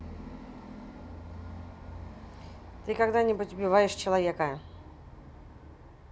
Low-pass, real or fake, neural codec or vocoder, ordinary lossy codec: none; real; none; none